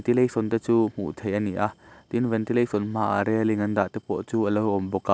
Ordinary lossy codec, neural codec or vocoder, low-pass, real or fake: none; none; none; real